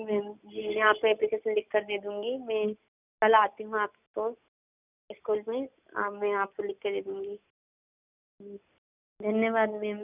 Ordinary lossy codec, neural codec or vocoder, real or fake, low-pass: none; none; real; 3.6 kHz